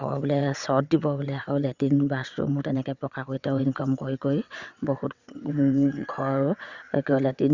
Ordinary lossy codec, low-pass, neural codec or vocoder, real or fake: Opus, 64 kbps; 7.2 kHz; vocoder, 22.05 kHz, 80 mel bands, WaveNeXt; fake